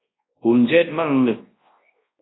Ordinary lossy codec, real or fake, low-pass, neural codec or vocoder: AAC, 16 kbps; fake; 7.2 kHz; codec, 24 kHz, 0.9 kbps, WavTokenizer, large speech release